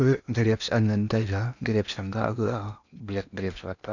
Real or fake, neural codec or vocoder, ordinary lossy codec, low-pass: fake; codec, 16 kHz in and 24 kHz out, 0.8 kbps, FocalCodec, streaming, 65536 codes; none; 7.2 kHz